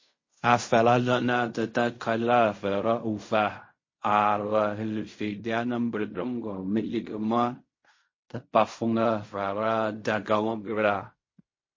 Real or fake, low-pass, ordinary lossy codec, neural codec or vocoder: fake; 7.2 kHz; MP3, 32 kbps; codec, 16 kHz in and 24 kHz out, 0.4 kbps, LongCat-Audio-Codec, fine tuned four codebook decoder